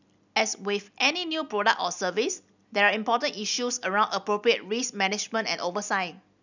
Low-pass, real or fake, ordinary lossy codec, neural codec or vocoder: 7.2 kHz; real; none; none